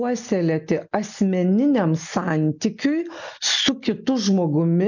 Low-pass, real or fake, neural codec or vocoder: 7.2 kHz; real; none